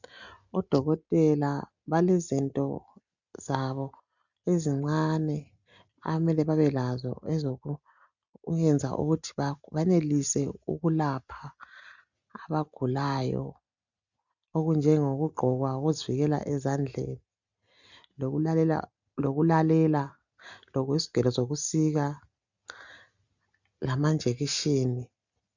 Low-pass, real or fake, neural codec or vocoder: 7.2 kHz; real; none